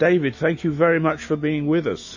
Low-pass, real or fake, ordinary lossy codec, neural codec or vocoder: 7.2 kHz; fake; MP3, 32 kbps; autoencoder, 48 kHz, 128 numbers a frame, DAC-VAE, trained on Japanese speech